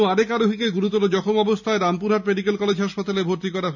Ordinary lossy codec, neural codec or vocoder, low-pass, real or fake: none; none; 7.2 kHz; real